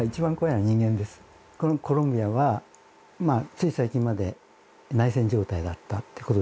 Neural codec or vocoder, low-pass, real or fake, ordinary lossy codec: none; none; real; none